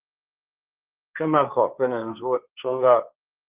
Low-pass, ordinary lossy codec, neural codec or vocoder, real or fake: 3.6 kHz; Opus, 24 kbps; codec, 16 kHz, 1.1 kbps, Voila-Tokenizer; fake